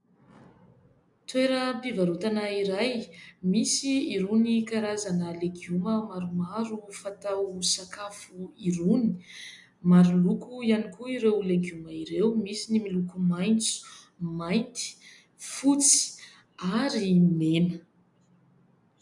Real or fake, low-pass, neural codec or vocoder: real; 10.8 kHz; none